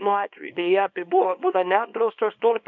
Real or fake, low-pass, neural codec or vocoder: fake; 7.2 kHz; codec, 24 kHz, 0.9 kbps, WavTokenizer, small release